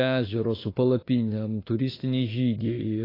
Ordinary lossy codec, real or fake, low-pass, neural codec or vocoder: AAC, 24 kbps; fake; 5.4 kHz; autoencoder, 48 kHz, 32 numbers a frame, DAC-VAE, trained on Japanese speech